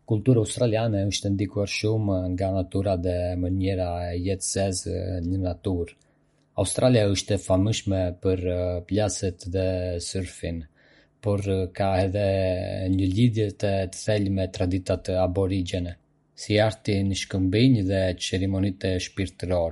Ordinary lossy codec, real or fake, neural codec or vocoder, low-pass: MP3, 48 kbps; real; none; 19.8 kHz